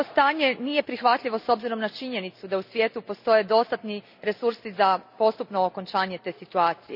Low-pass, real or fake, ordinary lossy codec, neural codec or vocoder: 5.4 kHz; real; none; none